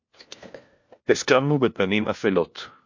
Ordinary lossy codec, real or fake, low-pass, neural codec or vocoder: MP3, 48 kbps; fake; 7.2 kHz; codec, 16 kHz, 1 kbps, FunCodec, trained on LibriTTS, 50 frames a second